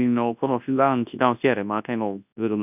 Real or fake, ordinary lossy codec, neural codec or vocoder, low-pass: fake; none; codec, 24 kHz, 0.9 kbps, WavTokenizer, large speech release; 3.6 kHz